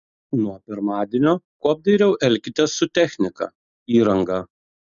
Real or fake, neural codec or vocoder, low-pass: real; none; 7.2 kHz